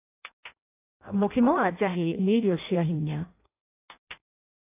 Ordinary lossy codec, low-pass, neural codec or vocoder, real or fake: AAC, 24 kbps; 3.6 kHz; codec, 16 kHz in and 24 kHz out, 0.6 kbps, FireRedTTS-2 codec; fake